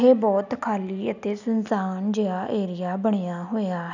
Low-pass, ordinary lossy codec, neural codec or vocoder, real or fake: 7.2 kHz; none; none; real